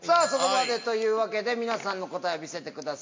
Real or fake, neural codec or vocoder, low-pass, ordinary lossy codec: real; none; 7.2 kHz; MP3, 48 kbps